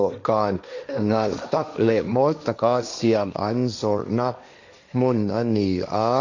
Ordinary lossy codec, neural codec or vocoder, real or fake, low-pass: none; codec, 16 kHz, 1.1 kbps, Voila-Tokenizer; fake; 7.2 kHz